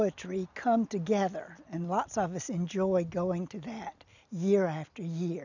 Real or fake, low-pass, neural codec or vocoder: real; 7.2 kHz; none